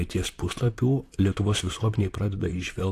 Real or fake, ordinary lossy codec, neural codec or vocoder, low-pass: fake; AAC, 64 kbps; vocoder, 44.1 kHz, 128 mel bands, Pupu-Vocoder; 14.4 kHz